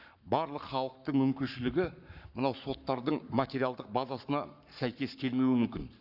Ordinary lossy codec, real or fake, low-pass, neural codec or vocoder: none; fake; 5.4 kHz; codec, 44.1 kHz, 7.8 kbps, Pupu-Codec